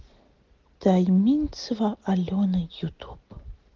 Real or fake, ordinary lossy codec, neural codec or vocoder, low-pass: real; Opus, 16 kbps; none; 7.2 kHz